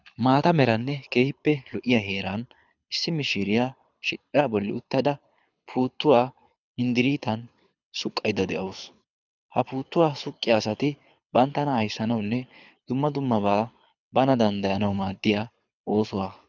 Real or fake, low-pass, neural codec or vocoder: fake; 7.2 kHz; codec, 24 kHz, 6 kbps, HILCodec